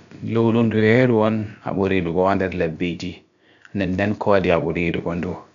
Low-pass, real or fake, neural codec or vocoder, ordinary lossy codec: 7.2 kHz; fake; codec, 16 kHz, about 1 kbps, DyCAST, with the encoder's durations; none